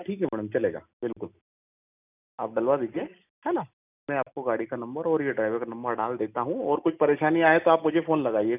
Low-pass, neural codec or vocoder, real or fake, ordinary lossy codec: 3.6 kHz; none; real; none